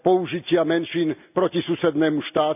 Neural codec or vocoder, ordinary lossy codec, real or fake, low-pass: none; none; real; 3.6 kHz